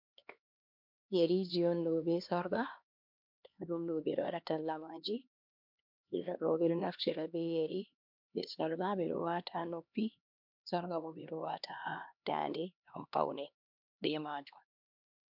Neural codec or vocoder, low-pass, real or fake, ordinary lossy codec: codec, 16 kHz, 2 kbps, X-Codec, HuBERT features, trained on LibriSpeech; 5.4 kHz; fake; MP3, 48 kbps